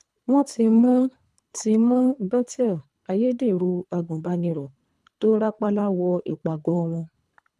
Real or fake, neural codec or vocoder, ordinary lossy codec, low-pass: fake; codec, 24 kHz, 3 kbps, HILCodec; none; none